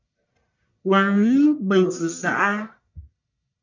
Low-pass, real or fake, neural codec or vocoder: 7.2 kHz; fake; codec, 44.1 kHz, 1.7 kbps, Pupu-Codec